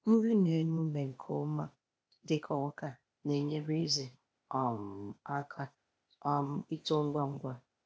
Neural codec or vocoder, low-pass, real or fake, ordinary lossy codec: codec, 16 kHz, 0.8 kbps, ZipCodec; none; fake; none